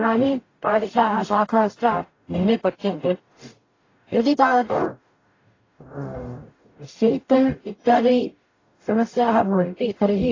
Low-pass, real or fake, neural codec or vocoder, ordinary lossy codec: 7.2 kHz; fake; codec, 44.1 kHz, 0.9 kbps, DAC; AAC, 32 kbps